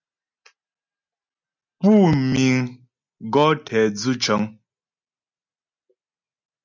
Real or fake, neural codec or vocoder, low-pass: real; none; 7.2 kHz